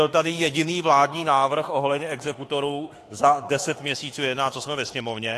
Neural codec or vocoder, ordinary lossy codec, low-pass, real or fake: autoencoder, 48 kHz, 32 numbers a frame, DAC-VAE, trained on Japanese speech; AAC, 48 kbps; 14.4 kHz; fake